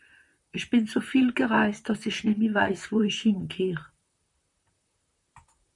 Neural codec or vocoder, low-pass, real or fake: vocoder, 44.1 kHz, 128 mel bands, Pupu-Vocoder; 10.8 kHz; fake